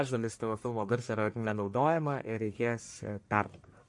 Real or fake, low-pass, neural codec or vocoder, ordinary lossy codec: fake; 10.8 kHz; codec, 44.1 kHz, 1.7 kbps, Pupu-Codec; MP3, 48 kbps